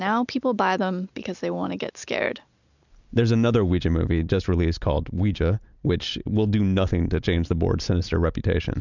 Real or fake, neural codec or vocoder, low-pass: real; none; 7.2 kHz